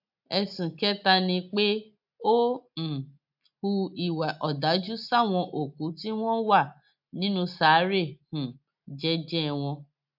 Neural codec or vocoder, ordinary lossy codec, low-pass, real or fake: none; AAC, 48 kbps; 5.4 kHz; real